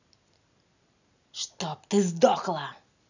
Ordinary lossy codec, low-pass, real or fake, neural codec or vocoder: none; 7.2 kHz; real; none